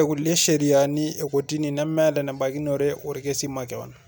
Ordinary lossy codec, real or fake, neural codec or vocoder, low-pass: none; real; none; none